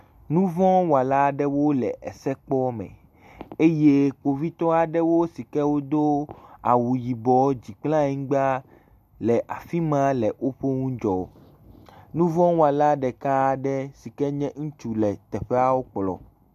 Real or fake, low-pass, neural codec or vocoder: real; 14.4 kHz; none